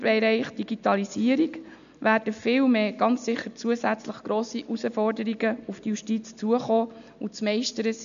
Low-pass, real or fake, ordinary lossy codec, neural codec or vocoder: 7.2 kHz; real; none; none